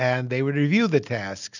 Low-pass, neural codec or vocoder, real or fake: 7.2 kHz; none; real